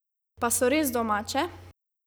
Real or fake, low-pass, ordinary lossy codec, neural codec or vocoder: real; none; none; none